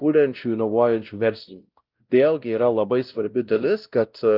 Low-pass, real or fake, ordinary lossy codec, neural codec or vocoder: 5.4 kHz; fake; Opus, 32 kbps; codec, 16 kHz, 0.5 kbps, X-Codec, WavLM features, trained on Multilingual LibriSpeech